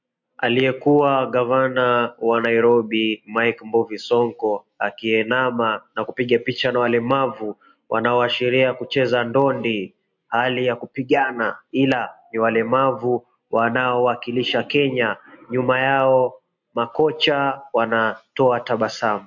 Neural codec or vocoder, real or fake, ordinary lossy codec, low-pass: none; real; MP3, 48 kbps; 7.2 kHz